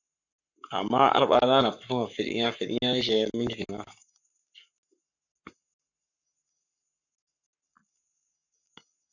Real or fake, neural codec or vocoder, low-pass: fake; codec, 44.1 kHz, 7.8 kbps, Pupu-Codec; 7.2 kHz